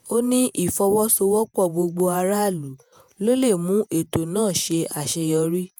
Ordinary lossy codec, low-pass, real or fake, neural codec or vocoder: none; none; fake; vocoder, 48 kHz, 128 mel bands, Vocos